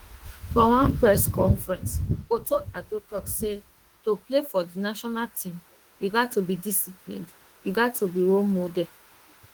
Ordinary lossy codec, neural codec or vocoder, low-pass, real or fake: Opus, 24 kbps; autoencoder, 48 kHz, 32 numbers a frame, DAC-VAE, trained on Japanese speech; 19.8 kHz; fake